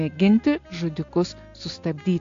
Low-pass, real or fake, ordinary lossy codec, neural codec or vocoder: 7.2 kHz; real; AAC, 48 kbps; none